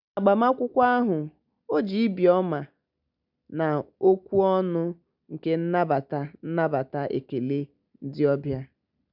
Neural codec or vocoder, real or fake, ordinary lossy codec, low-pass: none; real; none; 5.4 kHz